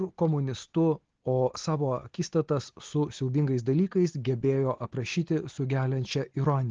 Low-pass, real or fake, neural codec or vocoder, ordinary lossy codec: 7.2 kHz; real; none; Opus, 16 kbps